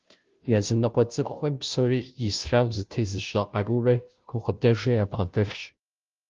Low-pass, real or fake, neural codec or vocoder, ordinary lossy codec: 7.2 kHz; fake; codec, 16 kHz, 0.5 kbps, FunCodec, trained on Chinese and English, 25 frames a second; Opus, 32 kbps